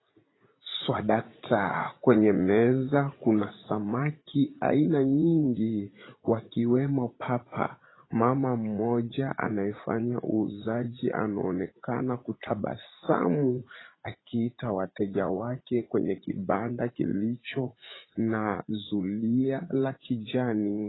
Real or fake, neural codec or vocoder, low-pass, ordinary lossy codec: fake; vocoder, 44.1 kHz, 80 mel bands, Vocos; 7.2 kHz; AAC, 16 kbps